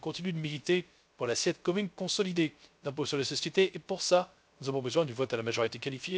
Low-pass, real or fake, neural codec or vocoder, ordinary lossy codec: none; fake; codec, 16 kHz, 0.3 kbps, FocalCodec; none